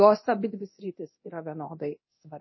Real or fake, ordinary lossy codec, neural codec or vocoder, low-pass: fake; MP3, 24 kbps; codec, 24 kHz, 0.9 kbps, DualCodec; 7.2 kHz